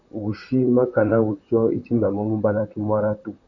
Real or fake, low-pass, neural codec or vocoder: fake; 7.2 kHz; vocoder, 44.1 kHz, 128 mel bands, Pupu-Vocoder